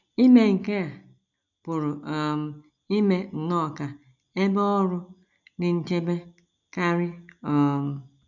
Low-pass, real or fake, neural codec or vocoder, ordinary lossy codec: 7.2 kHz; real; none; none